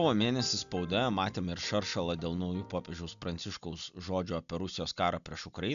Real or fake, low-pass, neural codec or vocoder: real; 7.2 kHz; none